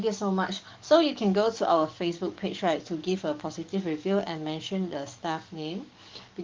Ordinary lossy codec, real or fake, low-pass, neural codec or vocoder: Opus, 32 kbps; fake; 7.2 kHz; codec, 44.1 kHz, 7.8 kbps, DAC